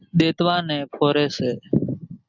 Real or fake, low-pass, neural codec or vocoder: real; 7.2 kHz; none